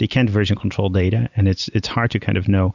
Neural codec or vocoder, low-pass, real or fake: none; 7.2 kHz; real